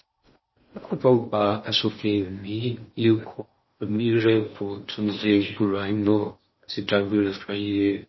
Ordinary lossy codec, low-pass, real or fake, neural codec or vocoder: MP3, 24 kbps; 7.2 kHz; fake; codec, 16 kHz in and 24 kHz out, 0.6 kbps, FocalCodec, streaming, 4096 codes